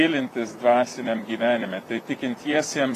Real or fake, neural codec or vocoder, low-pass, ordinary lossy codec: fake; vocoder, 44.1 kHz, 128 mel bands, Pupu-Vocoder; 14.4 kHz; AAC, 48 kbps